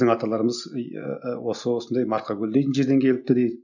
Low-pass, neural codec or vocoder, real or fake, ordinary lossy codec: 7.2 kHz; none; real; none